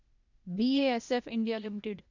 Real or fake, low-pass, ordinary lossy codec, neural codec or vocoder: fake; 7.2 kHz; none; codec, 16 kHz, 0.8 kbps, ZipCodec